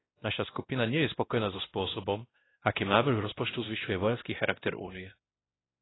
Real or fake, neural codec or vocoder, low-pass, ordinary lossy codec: fake; codec, 16 kHz, 1 kbps, X-Codec, WavLM features, trained on Multilingual LibriSpeech; 7.2 kHz; AAC, 16 kbps